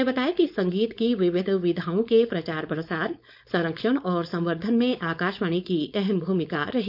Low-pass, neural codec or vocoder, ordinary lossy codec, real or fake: 5.4 kHz; codec, 16 kHz, 4.8 kbps, FACodec; none; fake